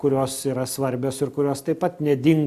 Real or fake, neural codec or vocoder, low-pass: real; none; 14.4 kHz